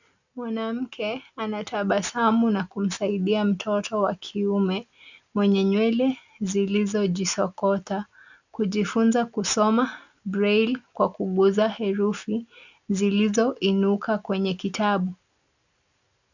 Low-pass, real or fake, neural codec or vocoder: 7.2 kHz; real; none